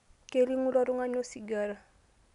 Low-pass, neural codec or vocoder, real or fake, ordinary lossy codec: 10.8 kHz; none; real; none